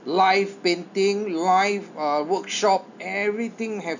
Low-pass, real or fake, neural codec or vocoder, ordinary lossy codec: 7.2 kHz; real; none; none